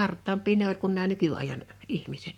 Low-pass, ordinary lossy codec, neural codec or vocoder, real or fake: 19.8 kHz; none; codec, 44.1 kHz, 7.8 kbps, Pupu-Codec; fake